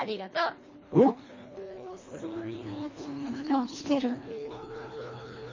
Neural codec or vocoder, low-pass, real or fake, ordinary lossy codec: codec, 24 kHz, 1.5 kbps, HILCodec; 7.2 kHz; fake; MP3, 32 kbps